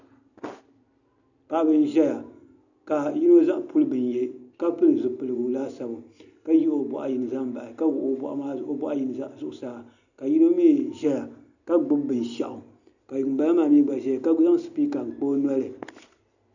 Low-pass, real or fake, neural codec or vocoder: 7.2 kHz; real; none